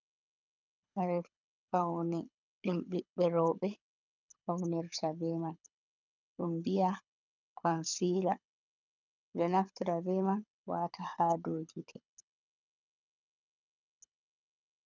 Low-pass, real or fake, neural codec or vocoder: 7.2 kHz; fake; codec, 24 kHz, 6 kbps, HILCodec